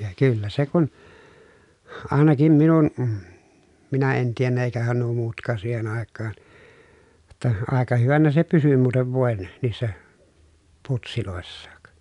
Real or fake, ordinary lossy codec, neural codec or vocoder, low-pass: real; none; none; 10.8 kHz